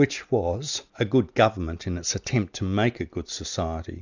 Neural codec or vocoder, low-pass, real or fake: none; 7.2 kHz; real